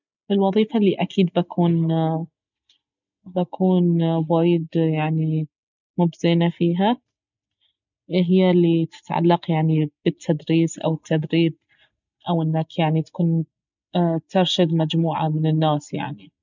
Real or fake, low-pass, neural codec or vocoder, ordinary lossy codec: real; 7.2 kHz; none; none